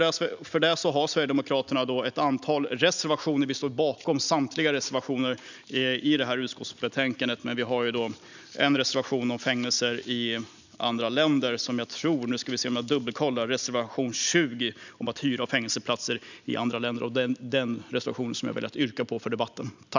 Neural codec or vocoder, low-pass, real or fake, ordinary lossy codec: none; 7.2 kHz; real; none